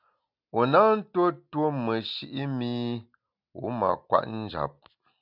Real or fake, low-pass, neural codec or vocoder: real; 5.4 kHz; none